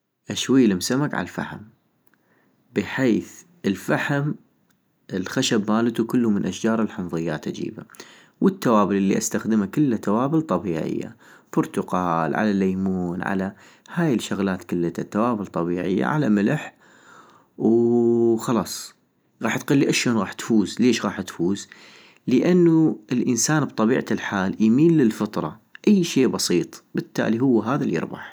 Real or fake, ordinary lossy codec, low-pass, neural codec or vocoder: real; none; none; none